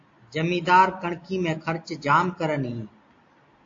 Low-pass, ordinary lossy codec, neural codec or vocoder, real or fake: 7.2 kHz; AAC, 48 kbps; none; real